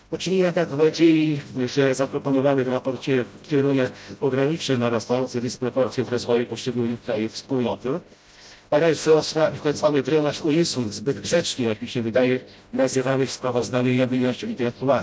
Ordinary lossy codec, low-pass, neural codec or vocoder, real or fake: none; none; codec, 16 kHz, 0.5 kbps, FreqCodec, smaller model; fake